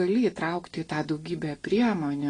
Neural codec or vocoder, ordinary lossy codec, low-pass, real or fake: none; AAC, 32 kbps; 9.9 kHz; real